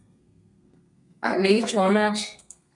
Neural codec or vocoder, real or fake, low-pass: codec, 32 kHz, 1.9 kbps, SNAC; fake; 10.8 kHz